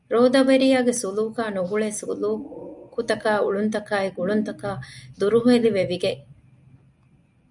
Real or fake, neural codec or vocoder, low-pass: real; none; 10.8 kHz